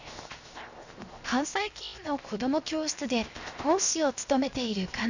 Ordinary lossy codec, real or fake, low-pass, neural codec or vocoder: none; fake; 7.2 kHz; codec, 16 kHz, 0.7 kbps, FocalCodec